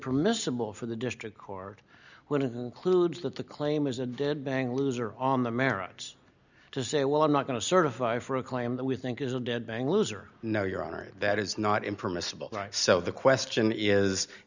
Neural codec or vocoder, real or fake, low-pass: none; real; 7.2 kHz